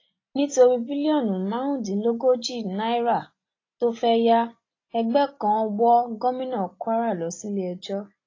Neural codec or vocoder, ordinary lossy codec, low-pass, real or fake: none; AAC, 32 kbps; 7.2 kHz; real